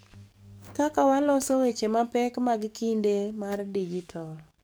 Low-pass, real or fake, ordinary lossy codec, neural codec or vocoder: none; fake; none; codec, 44.1 kHz, 7.8 kbps, DAC